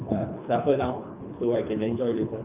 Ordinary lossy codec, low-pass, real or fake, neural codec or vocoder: none; 3.6 kHz; fake; codec, 24 kHz, 3 kbps, HILCodec